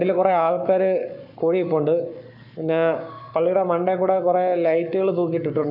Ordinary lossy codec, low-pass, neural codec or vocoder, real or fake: none; 5.4 kHz; codec, 44.1 kHz, 7.8 kbps, Pupu-Codec; fake